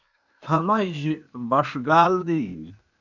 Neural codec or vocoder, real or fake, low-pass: codec, 16 kHz, 0.8 kbps, ZipCodec; fake; 7.2 kHz